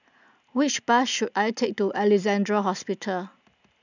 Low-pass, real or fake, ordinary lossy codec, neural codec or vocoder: 7.2 kHz; real; none; none